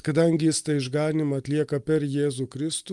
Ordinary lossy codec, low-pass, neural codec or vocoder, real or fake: Opus, 32 kbps; 10.8 kHz; none; real